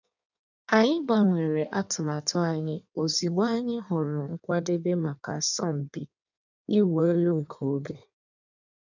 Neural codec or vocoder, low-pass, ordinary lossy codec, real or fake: codec, 16 kHz in and 24 kHz out, 1.1 kbps, FireRedTTS-2 codec; 7.2 kHz; none; fake